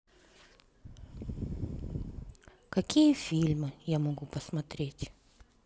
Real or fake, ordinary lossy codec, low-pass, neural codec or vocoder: real; none; none; none